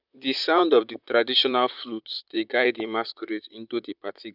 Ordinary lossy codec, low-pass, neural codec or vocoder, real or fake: none; 5.4 kHz; vocoder, 44.1 kHz, 128 mel bands, Pupu-Vocoder; fake